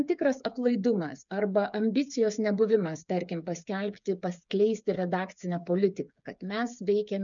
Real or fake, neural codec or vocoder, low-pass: fake; codec, 16 kHz, 8 kbps, FreqCodec, smaller model; 7.2 kHz